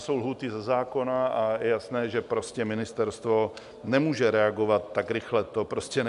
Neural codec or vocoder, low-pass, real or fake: none; 10.8 kHz; real